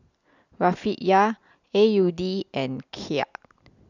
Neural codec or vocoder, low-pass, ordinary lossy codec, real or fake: none; 7.2 kHz; none; real